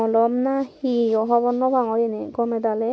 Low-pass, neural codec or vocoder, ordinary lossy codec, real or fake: none; none; none; real